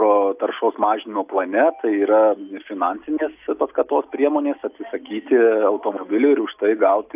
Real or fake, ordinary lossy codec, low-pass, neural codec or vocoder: real; AAC, 32 kbps; 3.6 kHz; none